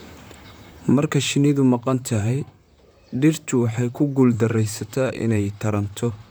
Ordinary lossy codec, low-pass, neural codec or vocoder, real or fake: none; none; vocoder, 44.1 kHz, 128 mel bands, Pupu-Vocoder; fake